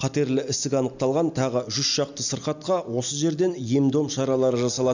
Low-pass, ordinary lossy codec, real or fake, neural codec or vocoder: 7.2 kHz; none; real; none